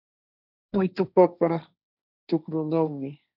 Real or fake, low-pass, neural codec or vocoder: fake; 5.4 kHz; codec, 16 kHz, 1.1 kbps, Voila-Tokenizer